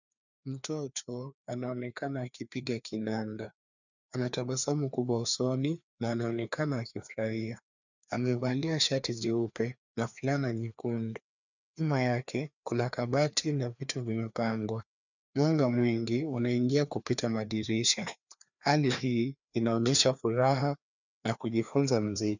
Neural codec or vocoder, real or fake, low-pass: codec, 16 kHz, 2 kbps, FreqCodec, larger model; fake; 7.2 kHz